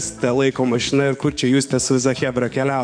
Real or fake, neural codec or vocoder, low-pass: fake; codec, 44.1 kHz, 7.8 kbps, DAC; 9.9 kHz